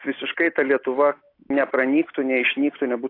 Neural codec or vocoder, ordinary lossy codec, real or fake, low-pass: none; AAC, 32 kbps; real; 5.4 kHz